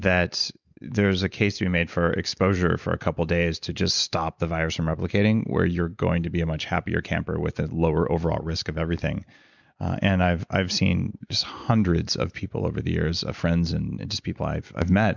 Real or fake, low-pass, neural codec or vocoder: real; 7.2 kHz; none